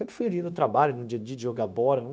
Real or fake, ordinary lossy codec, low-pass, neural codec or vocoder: fake; none; none; codec, 16 kHz, 0.9 kbps, LongCat-Audio-Codec